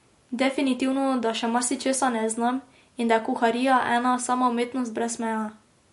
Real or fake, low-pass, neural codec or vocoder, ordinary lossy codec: real; 14.4 kHz; none; MP3, 48 kbps